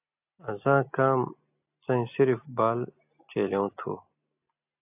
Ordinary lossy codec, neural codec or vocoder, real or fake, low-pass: AAC, 32 kbps; none; real; 3.6 kHz